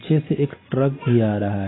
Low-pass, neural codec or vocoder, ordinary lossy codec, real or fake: 7.2 kHz; none; AAC, 16 kbps; real